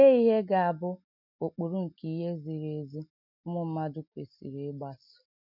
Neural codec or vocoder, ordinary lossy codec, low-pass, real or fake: none; none; 5.4 kHz; real